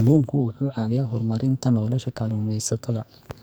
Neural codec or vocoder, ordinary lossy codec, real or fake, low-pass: codec, 44.1 kHz, 2.6 kbps, SNAC; none; fake; none